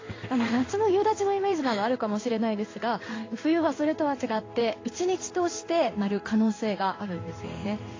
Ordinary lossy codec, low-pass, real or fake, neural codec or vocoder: AAC, 32 kbps; 7.2 kHz; fake; codec, 16 kHz in and 24 kHz out, 1 kbps, XY-Tokenizer